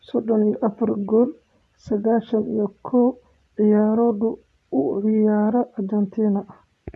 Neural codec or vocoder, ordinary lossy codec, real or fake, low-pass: vocoder, 24 kHz, 100 mel bands, Vocos; none; fake; none